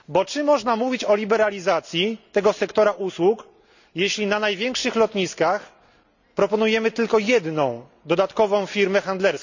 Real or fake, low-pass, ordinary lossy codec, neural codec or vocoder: real; 7.2 kHz; none; none